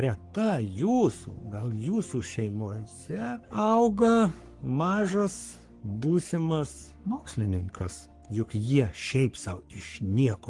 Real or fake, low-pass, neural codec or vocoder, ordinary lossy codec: fake; 10.8 kHz; codec, 44.1 kHz, 3.4 kbps, Pupu-Codec; Opus, 24 kbps